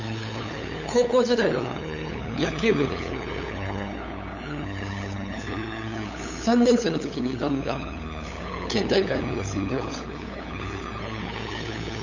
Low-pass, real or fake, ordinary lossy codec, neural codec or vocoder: 7.2 kHz; fake; none; codec, 16 kHz, 8 kbps, FunCodec, trained on LibriTTS, 25 frames a second